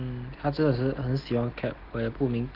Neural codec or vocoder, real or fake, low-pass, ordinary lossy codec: none; real; 5.4 kHz; Opus, 16 kbps